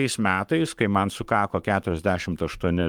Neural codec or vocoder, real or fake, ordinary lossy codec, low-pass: codec, 44.1 kHz, 7.8 kbps, Pupu-Codec; fake; Opus, 32 kbps; 19.8 kHz